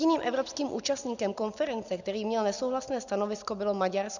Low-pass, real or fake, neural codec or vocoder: 7.2 kHz; real; none